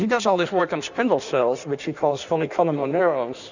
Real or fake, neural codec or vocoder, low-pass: fake; codec, 16 kHz in and 24 kHz out, 1.1 kbps, FireRedTTS-2 codec; 7.2 kHz